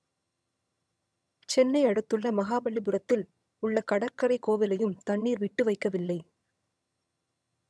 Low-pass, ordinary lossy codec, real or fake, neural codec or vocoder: none; none; fake; vocoder, 22.05 kHz, 80 mel bands, HiFi-GAN